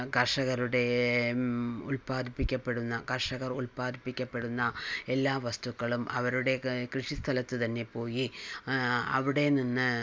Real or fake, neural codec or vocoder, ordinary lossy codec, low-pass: real; none; none; none